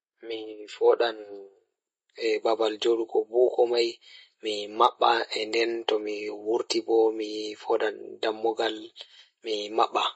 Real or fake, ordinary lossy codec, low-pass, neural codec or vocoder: real; MP3, 32 kbps; 10.8 kHz; none